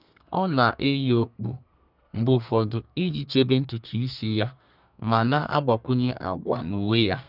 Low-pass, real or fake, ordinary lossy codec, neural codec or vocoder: 5.4 kHz; fake; none; codec, 32 kHz, 1.9 kbps, SNAC